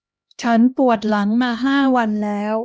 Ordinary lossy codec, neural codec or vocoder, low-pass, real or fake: none; codec, 16 kHz, 1 kbps, X-Codec, HuBERT features, trained on LibriSpeech; none; fake